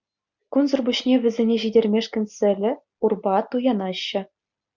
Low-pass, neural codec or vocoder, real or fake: 7.2 kHz; none; real